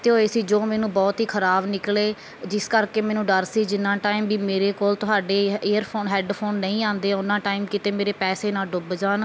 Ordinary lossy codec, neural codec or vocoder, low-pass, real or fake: none; none; none; real